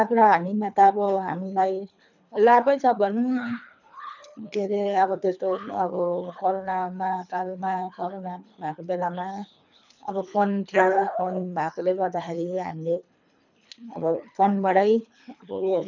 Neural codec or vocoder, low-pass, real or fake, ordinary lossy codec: codec, 24 kHz, 3 kbps, HILCodec; 7.2 kHz; fake; none